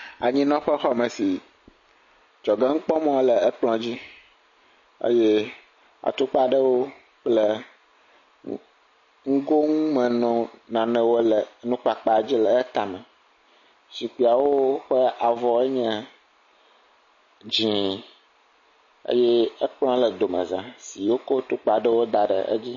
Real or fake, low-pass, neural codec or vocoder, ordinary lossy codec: real; 7.2 kHz; none; MP3, 32 kbps